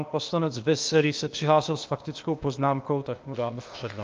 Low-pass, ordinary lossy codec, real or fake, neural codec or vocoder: 7.2 kHz; Opus, 32 kbps; fake; codec, 16 kHz, 0.8 kbps, ZipCodec